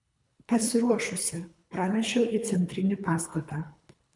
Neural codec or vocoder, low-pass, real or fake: codec, 24 kHz, 3 kbps, HILCodec; 10.8 kHz; fake